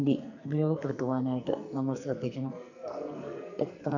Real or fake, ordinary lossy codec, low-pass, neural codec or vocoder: fake; none; 7.2 kHz; codec, 44.1 kHz, 2.6 kbps, SNAC